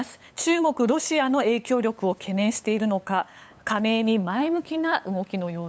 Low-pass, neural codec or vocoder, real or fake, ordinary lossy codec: none; codec, 16 kHz, 8 kbps, FunCodec, trained on LibriTTS, 25 frames a second; fake; none